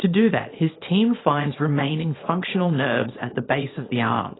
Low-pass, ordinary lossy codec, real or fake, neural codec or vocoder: 7.2 kHz; AAC, 16 kbps; fake; codec, 24 kHz, 0.9 kbps, WavTokenizer, small release